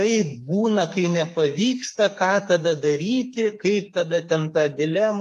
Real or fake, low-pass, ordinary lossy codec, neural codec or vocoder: fake; 14.4 kHz; AAC, 64 kbps; codec, 32 kHz, 1.9 kbps, SNAC